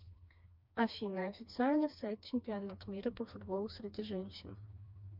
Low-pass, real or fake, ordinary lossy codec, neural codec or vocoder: 5.4 kHz; fake; MP3, 48 kbps; codec, 16 kHz, 2 kbps, FreqCodec, smaller model